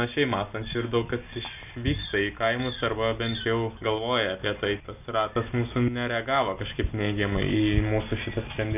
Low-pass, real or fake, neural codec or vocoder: 3.6 kHz; real; none